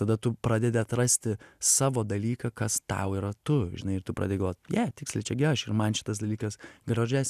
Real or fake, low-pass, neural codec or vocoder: real; 14.4 kHz; none